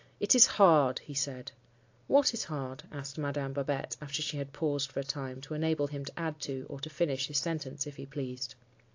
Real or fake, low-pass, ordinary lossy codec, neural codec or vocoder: real; 7.2 kHz; AAC, 48 kbps; none